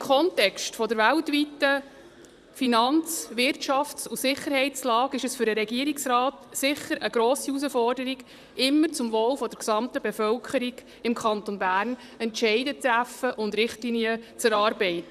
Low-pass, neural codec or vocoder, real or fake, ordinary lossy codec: 14.4 kHz; vocoder, 44.1 kHz, 128 mel bands, Pupu-Vocoder; fake; none